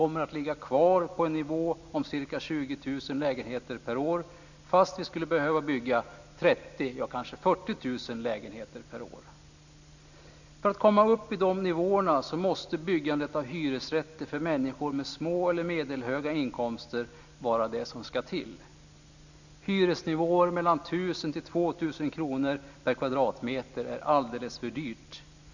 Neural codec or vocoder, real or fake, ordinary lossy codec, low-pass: none; real; none; 7.2 kHz